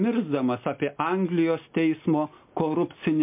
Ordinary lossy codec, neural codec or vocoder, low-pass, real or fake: MP3, 32 kbps; none; 3.6 kHz; real